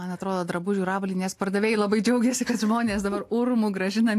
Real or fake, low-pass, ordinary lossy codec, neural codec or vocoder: real; 14.4 kHz; AAC, 64 kbps; none